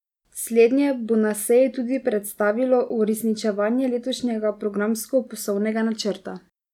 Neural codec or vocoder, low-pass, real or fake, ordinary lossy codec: none; 19.8 kHz; real; none